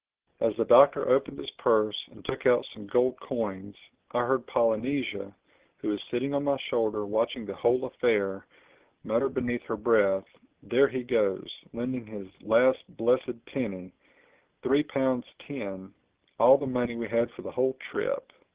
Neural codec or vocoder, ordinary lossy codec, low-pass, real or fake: none; Opus, 16 kbps; 3.6 kHz; real